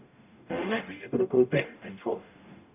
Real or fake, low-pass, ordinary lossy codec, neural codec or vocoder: fake; 3.6 kHz; none; codec, 44.1 kHz, 0.9 kbps, DAC